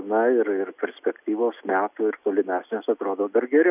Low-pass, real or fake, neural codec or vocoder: 3.6 kHz; real; none